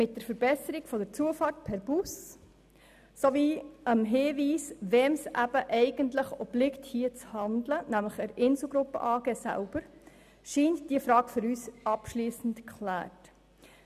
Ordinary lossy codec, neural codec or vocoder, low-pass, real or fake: none; none; 14.4 kHz; real